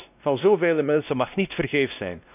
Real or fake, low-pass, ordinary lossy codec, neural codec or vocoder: fake; 3.6 kHz; none; codec, 16 kHz, 1 kbps, X-Codec, WavLM features, trained on Multilingual LibriSpeech